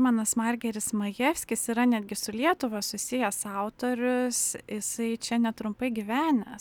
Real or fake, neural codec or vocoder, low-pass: real; none; 19.8 kHz